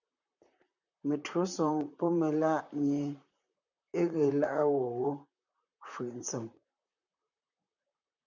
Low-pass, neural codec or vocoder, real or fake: 7.2 kHz; vocoder, 44.1 kHz, 128 mel bands, Pupu-Vocoder; fake